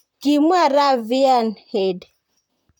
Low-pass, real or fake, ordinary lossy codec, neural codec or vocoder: 19.8 kHz; fake; none; vocoder, 44.1 kHz, 128 mel bands, Pupu-Vocoder